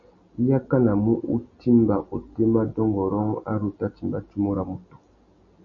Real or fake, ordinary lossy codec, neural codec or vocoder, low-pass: real; MP3, 32 kbps; none; 7.2 kHz